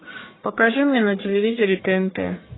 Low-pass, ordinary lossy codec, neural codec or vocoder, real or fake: 7.2 kHz; AAC, 16 kbps; codec, 44.1 kHz, 1.7 kbps, Pupu-Codec; fake